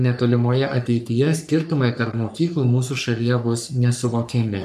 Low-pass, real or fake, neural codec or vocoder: 14.4 kHz; fake; codec, 44.1 kHz, 3.4 kbps, Pupu-Codec